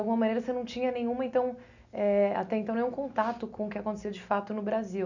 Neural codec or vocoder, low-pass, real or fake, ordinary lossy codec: none; 7.2 kHz; real; none